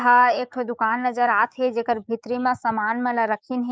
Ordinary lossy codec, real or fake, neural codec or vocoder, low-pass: none; fake; codec, 16 kHz, 6 kbps, DAC; none